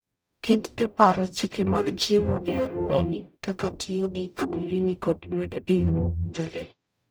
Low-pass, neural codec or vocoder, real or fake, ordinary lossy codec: none; codec, 44.1 kHz, 0.9 kbps, DAC; fake; none